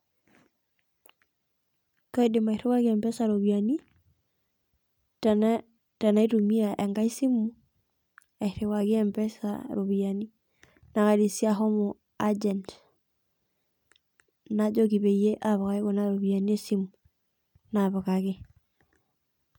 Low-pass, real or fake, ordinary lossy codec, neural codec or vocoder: 19.8 kHz; real; none; none